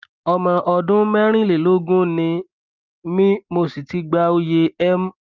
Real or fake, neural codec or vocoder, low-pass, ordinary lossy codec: real; none; 7.2 kHz; Opus, 24 kbps